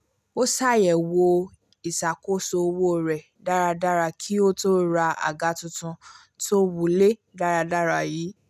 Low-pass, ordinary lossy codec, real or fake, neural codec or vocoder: 14.4 kHz; none; real; none